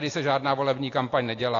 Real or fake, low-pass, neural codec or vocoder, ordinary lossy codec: real; 7.2 kHz; none; AAC, 32 kbps